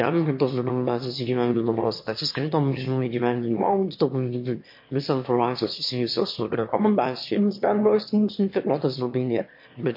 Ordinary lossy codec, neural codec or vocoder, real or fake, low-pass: MP3, 32 kbps; autoencoder, 22.05 kHz, a latent of 192 numbers a frame, VITS, trained on one speaker; fake; 5.4 kHz